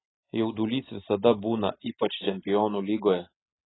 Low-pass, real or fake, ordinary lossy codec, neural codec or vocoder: 7.2 kHz; real; AAC, 16 kbps; none